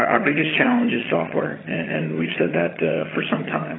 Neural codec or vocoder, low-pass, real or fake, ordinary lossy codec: vocoder, 22.05 kHz, 80 mel bands, HiFi-GAN; 7.2 kHz; fake; AAC, 16 kbps